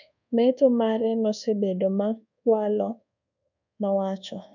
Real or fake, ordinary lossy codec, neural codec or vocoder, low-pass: fake; none; codec, 24 kHz, 1.2 kbps, DualCodec; 7.2 kHz